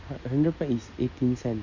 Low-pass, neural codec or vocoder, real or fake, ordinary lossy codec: 7.2 kHz; none; real; none